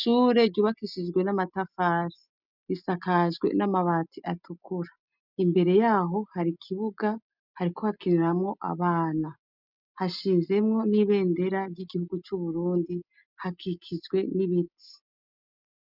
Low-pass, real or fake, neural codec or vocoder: 5.4 kHz; real; none